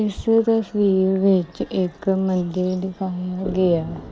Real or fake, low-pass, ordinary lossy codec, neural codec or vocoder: real; none; none; none